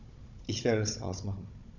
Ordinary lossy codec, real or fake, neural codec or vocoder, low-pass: none; fake; codec, 16 kHz, 16 kbps, FunCodec, trained on Chinese and English, 50 frames a second; 7.2 kHz